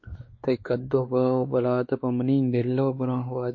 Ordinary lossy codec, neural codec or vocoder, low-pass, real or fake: MP3, 32 kbps; codec, 16 kHz, 2 kbps, X-Codec, WavLM features, trained on Multilingual LibriSpeech; 7.2 kHz; fake